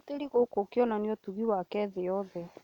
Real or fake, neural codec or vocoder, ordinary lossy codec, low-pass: real; none; none; 19.8 kHz